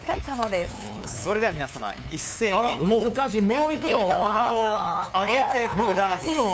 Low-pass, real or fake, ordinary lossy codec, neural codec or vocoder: none; fake; none; codec, 16 kHz, 2 kbps, FunCodec, trained on LibriTTS, 25 frames a second